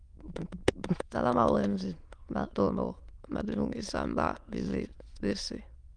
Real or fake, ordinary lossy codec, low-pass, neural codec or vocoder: fake; Opus, 32 kbps; 9.9 kHz; autoencoder, 22.05 kHz, a latent of 192 numbers a frame, VITS, trained on many speakers